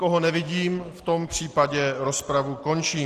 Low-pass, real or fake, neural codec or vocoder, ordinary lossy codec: 14.4 kHz; real; none; Opus, 16 kbps